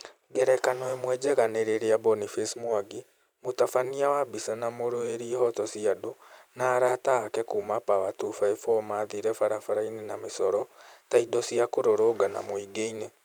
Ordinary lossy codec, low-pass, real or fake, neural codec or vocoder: none; none; fake; vocoder, 44.1 kHz, 128 mel bands every 512 samples, BigVGAN v2